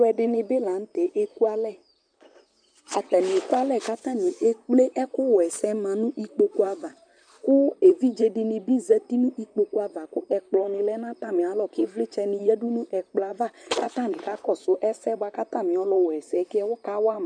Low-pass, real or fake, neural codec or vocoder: 9.9 kHz; fake; vocoder, 44.1 kHz, 128 mel bands, Pupu-Vocoder